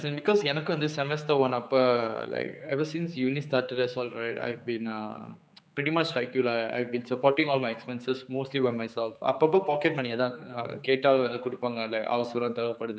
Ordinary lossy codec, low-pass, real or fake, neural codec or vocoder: none; none; fake; codec, 16 kHz, 4 kbps, X-Codec, HuBERT features, trained on general audio